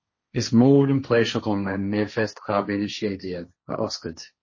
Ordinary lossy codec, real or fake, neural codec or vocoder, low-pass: MP3, 32 kbps; fake; codec, 16 kHz, 1.1 kbps, Voila-Tokenizer; 7.2 kHz